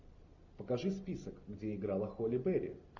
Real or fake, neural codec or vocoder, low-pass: real; none; 7.2 kHz